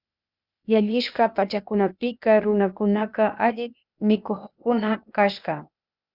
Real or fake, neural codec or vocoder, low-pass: fake; codec, 16 kHz, 0.8 kbps, ZipCodec; 5.4 kHz